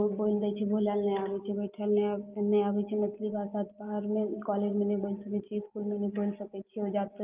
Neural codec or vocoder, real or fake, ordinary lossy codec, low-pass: none; real; Opus, 24 kbps; 3.6 kHz